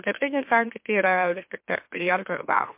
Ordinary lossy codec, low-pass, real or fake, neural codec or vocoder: MP3, 32 kbps; 3.6 kHz; fake; autoencoder, 44.1 kHz, a latent of 192 numbers a frame, MeloTTS